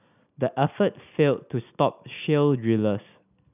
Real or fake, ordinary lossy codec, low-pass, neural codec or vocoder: real; none; 3.6 kHz; none